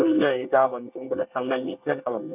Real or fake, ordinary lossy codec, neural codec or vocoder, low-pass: fake; none; codec, 24 kHz, 1 kbps, SNAC; 3.6 kHz